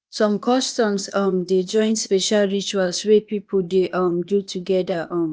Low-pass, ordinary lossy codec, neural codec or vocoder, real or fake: none; none; codec, 16 kHz, 0.8 kbps, ZipCodec; fake